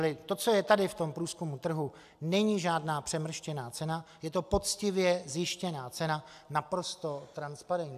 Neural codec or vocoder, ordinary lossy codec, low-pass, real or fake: none; AAC, 96 kbps; 14.4 kHz; real